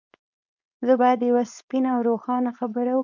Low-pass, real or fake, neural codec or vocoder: 7.2 kHz; fake; codec, 16 kHz, 4.8 kbps, FACodec